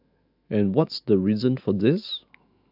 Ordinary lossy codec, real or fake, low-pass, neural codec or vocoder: AAC, 48 kbps; fake; 5.4 kHz; autoencoder, 48 kHz, 128 numbers a frame, DAC-VAE, trained on Japanese speech